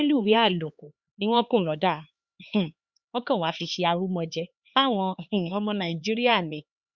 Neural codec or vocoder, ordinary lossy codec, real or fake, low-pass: codec, 16 kHz, 4 kbps, X-Codec, HuBERT features, trained on LibriSpeech; Opus, 64 kbps; fake; 7.2 kHz